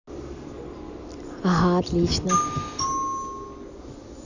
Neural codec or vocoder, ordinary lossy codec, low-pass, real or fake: none; none; 7.2 kHz; real